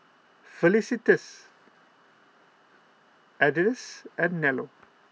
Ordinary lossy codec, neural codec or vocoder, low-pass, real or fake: none; none; none; real